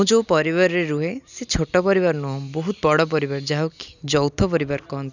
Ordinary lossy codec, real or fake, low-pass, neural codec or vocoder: none; real; 7.2 kHz; none